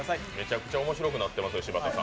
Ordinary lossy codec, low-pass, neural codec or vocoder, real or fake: none; none; none; real